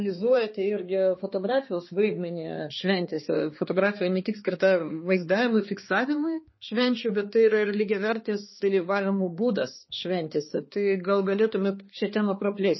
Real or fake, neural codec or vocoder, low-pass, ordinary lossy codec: fake; codec, 16 kHz, 2 kbps, X-Codec, HuBERT features, trained on balanced general audio; 7.2 kHz; MP3, 24 kbps